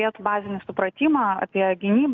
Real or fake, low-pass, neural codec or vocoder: real; 7.2 kHz; none